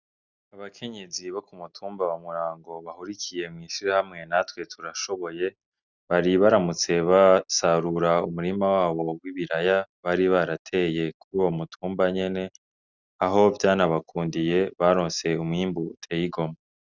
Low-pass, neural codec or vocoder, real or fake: 7.2 kHz; none; real